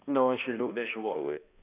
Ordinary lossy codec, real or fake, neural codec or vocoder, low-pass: none; fake; codec, 16 kHz, 1 kbps, X-Codec, HuBERT features, trained on balanced general audio; 3.6 kHz